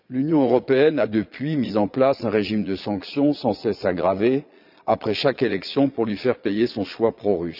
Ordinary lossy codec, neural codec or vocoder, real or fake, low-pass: none; vocoder, 22.05 kHz, 80 mel bands, Vocos; fake; 5.4 kHz